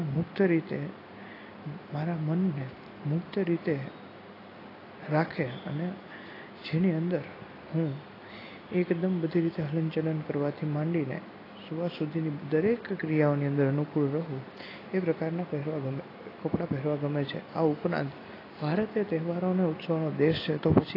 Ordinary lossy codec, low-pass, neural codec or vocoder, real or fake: AAC, 24 kbps; 5.4 kHz; none; real